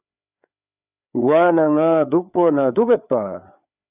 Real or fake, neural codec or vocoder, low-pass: fake; codec, 16 kHz, 4 kbps, FreqCodec, larger model; 3.6 kHz